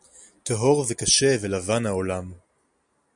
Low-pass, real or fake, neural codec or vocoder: 10.8 kHz; real; none